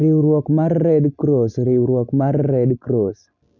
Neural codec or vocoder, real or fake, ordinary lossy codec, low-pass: none; real; none; 7.2 kHz